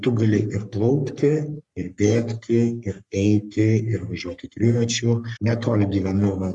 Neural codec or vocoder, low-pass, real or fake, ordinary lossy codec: codec, 44.1 kHz, 3.4 kbps, Pupu-Codec; 10.8 kHz; fake; Opus, 64 kbps